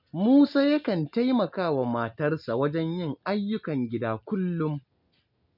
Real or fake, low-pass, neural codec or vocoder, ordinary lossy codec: real; 5.4 kHz; none; none